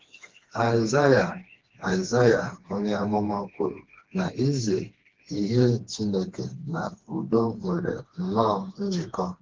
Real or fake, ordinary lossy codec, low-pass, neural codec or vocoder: fake; Opus, 16 kbps; 7.2 kHz; codec, 16 kHz, 2 kbps, FreqCodec, smaller model